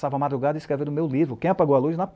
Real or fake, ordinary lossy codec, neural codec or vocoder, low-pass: real; none; none; none